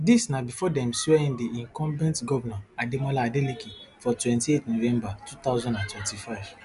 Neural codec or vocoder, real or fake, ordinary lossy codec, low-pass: none; real; none; 10.8 kHz